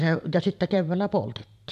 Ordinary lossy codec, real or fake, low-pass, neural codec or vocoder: MP3, 96 kbps; fake; 14.4 kHz; vocoder, 44.1 kHz, 128 mel bands every 512 samples, BigVGAN v2